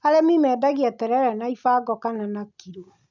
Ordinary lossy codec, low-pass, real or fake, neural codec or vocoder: none; 7.2 kHz; real; none